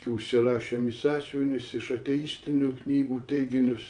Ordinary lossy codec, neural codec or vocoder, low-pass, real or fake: MP3, 64 kbps; vocoder, 22.05 kHz, 80 mel bands, WaveNeXt; 9.9 kHz; fake